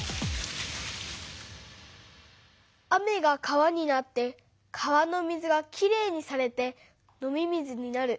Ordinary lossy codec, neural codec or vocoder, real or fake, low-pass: none; none; real; none